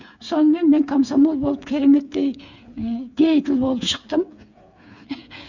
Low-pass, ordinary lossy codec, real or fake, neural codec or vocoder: 7.2 kHz; none; fake; codec, 16 kHz, 8 kbps, FreqCodec, smaller model